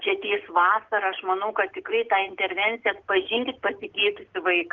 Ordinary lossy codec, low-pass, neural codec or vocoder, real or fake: Opus, 16 kbps; 7.2 kHz; none; real